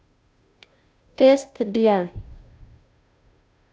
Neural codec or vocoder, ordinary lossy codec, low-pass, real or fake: codec, 16 kHz, 0.5 kbps, FunCodec, trained on Chinese and English, 25 frames a second; none; none; fake